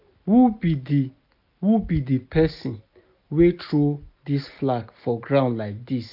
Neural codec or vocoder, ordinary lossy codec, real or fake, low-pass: none; none; real; 5.4 kHz